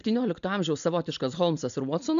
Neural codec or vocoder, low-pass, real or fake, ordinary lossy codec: none; 7.2 kHz; real; AAC, 96 kbps